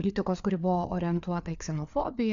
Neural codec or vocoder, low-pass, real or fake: codec, 16 kHz, 4 kbps, FreqCodec, larger model; 7.2 kHz; fake